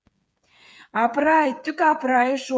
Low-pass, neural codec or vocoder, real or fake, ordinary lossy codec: none; codec, 16 kHz, 8 kbps, FreqCodec, smaller model; fake; none